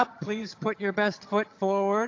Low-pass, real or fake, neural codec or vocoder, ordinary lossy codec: 7.2 kHz; fake; vocoder, 22.05 kHz, 80 mel bands, HiFi-GAN; AAC, 48 kbps